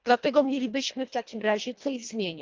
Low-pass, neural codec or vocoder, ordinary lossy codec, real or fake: 7.2 kHz; codec, 24 kHz, 1.5 kbps, HILCodec; Opus, 24 kbps; fake